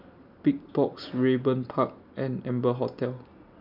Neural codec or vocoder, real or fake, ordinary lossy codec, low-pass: none; real; none; 5.4 kHz